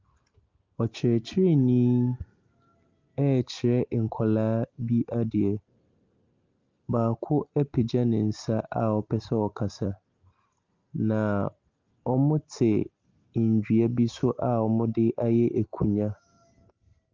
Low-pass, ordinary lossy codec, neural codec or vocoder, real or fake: 7.2 kHz; Opus, 24 kbps; none; real